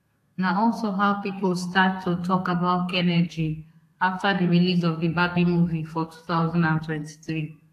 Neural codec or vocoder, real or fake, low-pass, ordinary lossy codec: codec, 32 kHz, 1.9 kbps, SNAC; fake; 14.4 kHz; AAC, 64 kbps